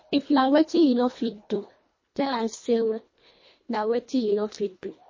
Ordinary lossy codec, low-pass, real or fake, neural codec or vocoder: MP3, 32 kbps; 7.2 kHz; fake; codec, 24 kHz, 1.5 kbps, HILCodec